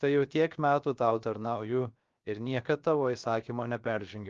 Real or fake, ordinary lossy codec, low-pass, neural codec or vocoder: fake; Opus, 32 kbps; 7.2 kHz; codec, 16 kHz, 0.7 kbps, FocalCodec